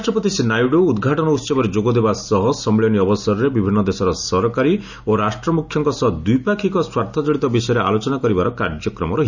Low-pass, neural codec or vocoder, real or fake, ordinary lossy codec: 7.2 kHz; none; real; none